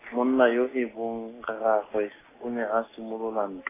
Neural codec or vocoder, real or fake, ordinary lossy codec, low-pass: none; real; AAC, 16 kbps; 3.6 kHz